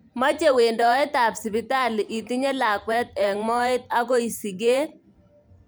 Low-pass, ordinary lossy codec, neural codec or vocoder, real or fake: none; none; vocoder, 44.1 kHz, 128 mel bands every 512 samples, BigVGAN v2; fake